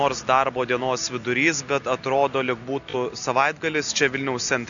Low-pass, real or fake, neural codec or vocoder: 7.2 kHz; real; none